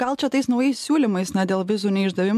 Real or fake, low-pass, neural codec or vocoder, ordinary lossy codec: real; 14.4 kHz; none; MP3, 96 kbps